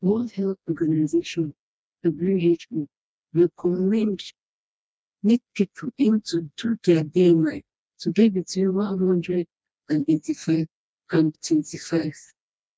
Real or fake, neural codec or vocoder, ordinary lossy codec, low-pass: fake; codec, 16 kHz, 1 kbps, FreqCodec, smaller model; none; none